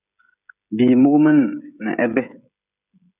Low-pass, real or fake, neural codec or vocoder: 3.6 kHz; fake; codec, 16 kHz, 16 kbps, FreqCodec, smaller model